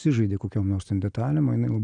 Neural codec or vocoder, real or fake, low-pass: none; real; 9.9 kHz